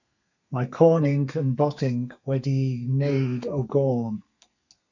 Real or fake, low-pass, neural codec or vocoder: fake; 7.2 kHz; codec, 32 kHz, 1.9 kbps, SNAC